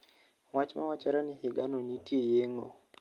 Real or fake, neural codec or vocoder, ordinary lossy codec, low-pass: real; none; Opus, 32 kbps; 19.8 kHz